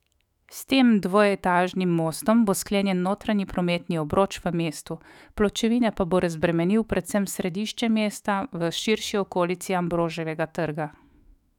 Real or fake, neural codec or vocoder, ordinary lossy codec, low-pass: fake; autoencoder, 48 kHz, 128 numbers a frame, DAC-VAE, trained on Japanese speech; none; 19.8 kHz